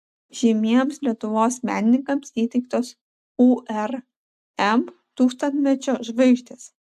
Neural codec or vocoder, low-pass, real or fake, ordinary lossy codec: none; 14.4 kHz; real; AAC, 96 kbps